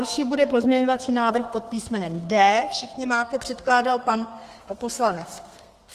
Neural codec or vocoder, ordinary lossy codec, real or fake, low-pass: codec, 32 kHz, 1.9 kbps, SNAC; Opus, 24 kbps; fake; 14.4 kHz